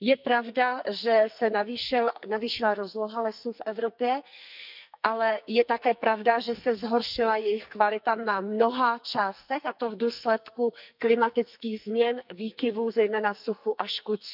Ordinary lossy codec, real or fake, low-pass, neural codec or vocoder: none; fake; 5.4 kHz; codec, 44.1 kHz, 2.6 kbps, SNAC